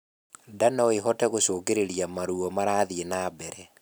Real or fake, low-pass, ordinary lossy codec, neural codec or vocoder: real; none; none; none